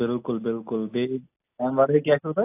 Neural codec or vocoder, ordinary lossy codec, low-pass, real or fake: none; AAC, 24 kbps; 3.6 kHz; real